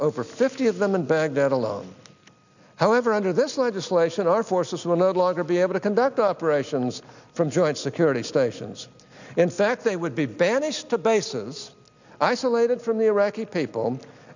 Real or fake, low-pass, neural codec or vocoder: real; 7.2 kHz; none